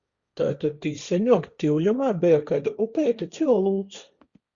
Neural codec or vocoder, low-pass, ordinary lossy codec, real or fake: codec, 16 kHz, 1.1 kbps, Voila-Tokenizer; 7.2 kHz; Opus, 64 kbps; fake